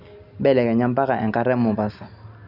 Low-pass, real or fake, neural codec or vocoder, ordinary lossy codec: 5.4 kHz; real; none; none